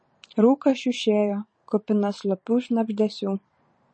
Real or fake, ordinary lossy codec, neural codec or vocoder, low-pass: fake; MP3, 32 kbps; vocoder, 44.1 kHz, 128 mel bands every 512 samples, BigVGAN v2; 9.9 kHz